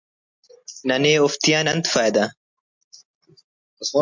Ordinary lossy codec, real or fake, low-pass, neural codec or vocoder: MP3, 64 kbps; real; 7.2 kHz; none